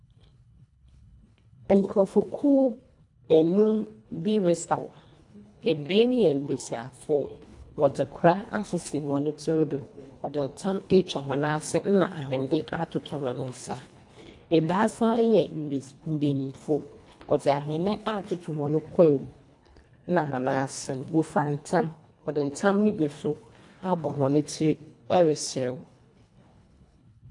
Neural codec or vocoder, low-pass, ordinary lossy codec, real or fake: codec, 24 kHz, 1.5 kbps, HILCodec; 10.8 kHz; AAC, 64 kbps; fake